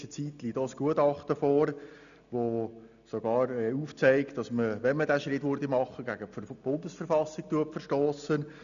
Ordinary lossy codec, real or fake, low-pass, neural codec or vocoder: none; real; 7.2 kHz; none